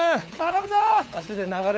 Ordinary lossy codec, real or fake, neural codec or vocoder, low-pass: none; fake; codec, 16 kHz, 8 kbps, FunCodec, trained on LibriTTS, 25 frames a second; none